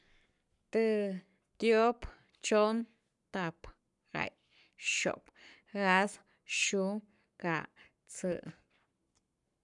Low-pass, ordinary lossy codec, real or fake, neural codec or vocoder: 10.8 kHz; none; fake; codec, 44.1 kHz, 7.8 kbps, Pupu-Codec